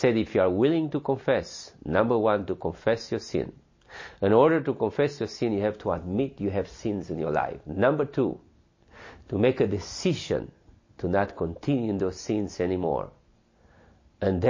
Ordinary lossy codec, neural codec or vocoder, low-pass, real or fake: MP3, 32 kbps; none; 7.2 kHz; real